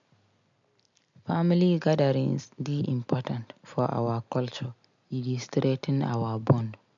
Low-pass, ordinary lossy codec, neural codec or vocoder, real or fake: 7.2 kHz; MP3, 64 kbps; none; real